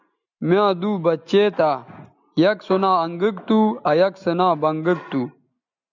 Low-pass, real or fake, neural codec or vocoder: 7.2 kHz; real; none